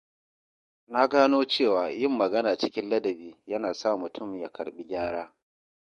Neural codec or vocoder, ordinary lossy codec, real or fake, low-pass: codec, 44.1 kHz, 7.8 kbps, DAC; MP3, 48 kbps; fake; 14.4 kHz